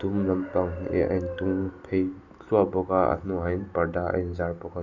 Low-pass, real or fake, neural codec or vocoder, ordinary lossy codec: 7.2 kHz; real; none; none